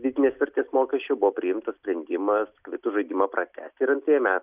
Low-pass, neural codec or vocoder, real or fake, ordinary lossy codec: 3.6 kHz; none; real; Opus, 64 kbps